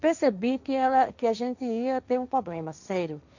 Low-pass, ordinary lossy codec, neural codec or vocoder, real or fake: 7.2 kHz; none; codec, 16 kHz, 1.1 kbps, Voila-Tokenizer; fake